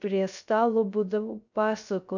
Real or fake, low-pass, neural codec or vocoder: fake; 7.2 kHz; codec, 16 kHz, 0.3 kbps, FocalCodec